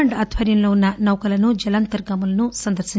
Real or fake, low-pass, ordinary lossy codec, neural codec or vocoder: real; none; none; none